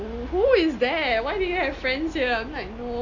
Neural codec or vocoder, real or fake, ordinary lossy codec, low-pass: none; real; none; 7.2 kHz